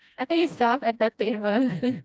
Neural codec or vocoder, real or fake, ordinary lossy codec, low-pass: codec, 16 kHz, 1 kbps, FreqCodec, smaller model; fake; none; none